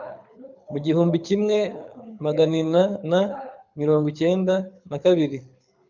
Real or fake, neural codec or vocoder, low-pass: fake; codec, 24 kHz, 6 kbps, HILCodec; 7.2 kHz